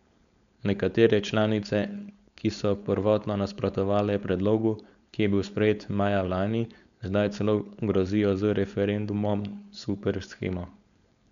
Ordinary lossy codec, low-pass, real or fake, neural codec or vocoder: MP3, 96 kbps; 7.2 kHz; fake; codec, 16 kHz, 4.8 kbps, FACodec